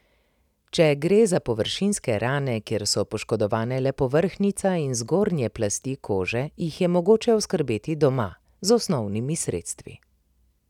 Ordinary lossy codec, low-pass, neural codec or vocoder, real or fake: none; 19.8 kHz; none; real